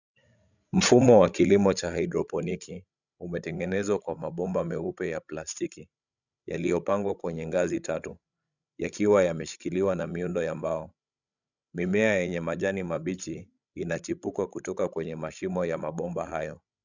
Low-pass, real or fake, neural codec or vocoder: 7.2 kHz; fake; codec, 16 kHz, 16 kbps, FreqCodec, larger model